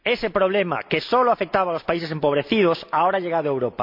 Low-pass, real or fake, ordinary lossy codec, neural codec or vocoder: 5.4 kHz; real; MP3, 48 kbps; none